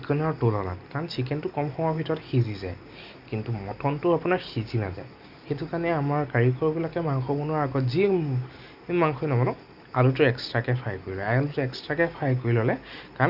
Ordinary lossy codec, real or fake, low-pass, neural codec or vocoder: Opus, 64 kbps; real; 5.4 kHz; none